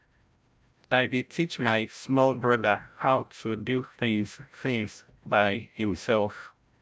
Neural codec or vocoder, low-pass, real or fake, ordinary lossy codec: codec, 16 kHz, 0.5 kbps, FreqCodec, larger model; none; fake; none